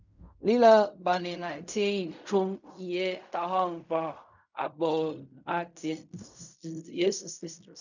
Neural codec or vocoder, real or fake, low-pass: codec, 16 kHz in and 24 kHz out, 0.4 kbps, LongCat-Audio-Codec, fine tuned four codebook decoder; fake; 7.2 kHz